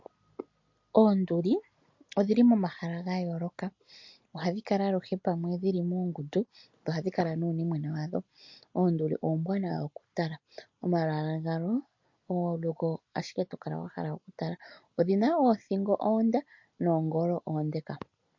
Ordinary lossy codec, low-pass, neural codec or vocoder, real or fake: MP3, 48 kbps; 7.2 kHz; none; real